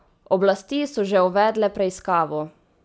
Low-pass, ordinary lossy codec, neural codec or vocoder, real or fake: none; none; none; real